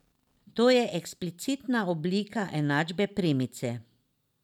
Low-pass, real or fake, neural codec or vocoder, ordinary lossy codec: 19.8 kHz; real; none; none